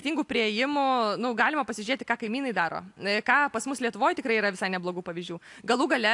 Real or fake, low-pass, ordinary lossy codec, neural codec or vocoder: real; 10.8 kHz; AAC, 64 kbps; none